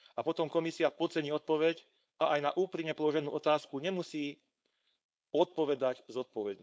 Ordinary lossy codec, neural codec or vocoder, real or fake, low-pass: none; codec, 16 kHz, 4.8 kbps, FACodec; fake; none